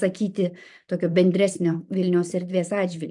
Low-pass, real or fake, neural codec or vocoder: 10.8 kHz; fake; vocoder, 44.1 kHz, 128 mel bands every 512 samples, BigVGAN v2